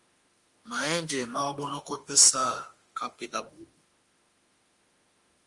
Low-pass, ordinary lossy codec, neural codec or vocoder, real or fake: 10.8 kHz; Opus, 32 kbps; autoencoder, 48 kHz, 32 numbers a frame, DAC-VAE, trained on Japanese speech; fake